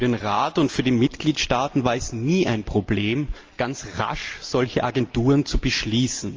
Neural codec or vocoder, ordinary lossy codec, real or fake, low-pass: none; Opus, 24 kbps; real; 7.2 kHz